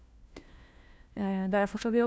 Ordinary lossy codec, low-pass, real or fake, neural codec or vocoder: none; none; fake; codec, 16 kHz, 1 kbps, FunCodec, trained on LibriTTS, 50 frames a second